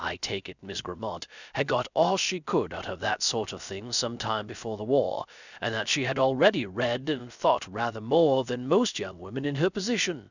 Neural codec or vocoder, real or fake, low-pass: codec, 16 kHz, 0.3 kbps, FocalCodec; fake; 7.2 kHz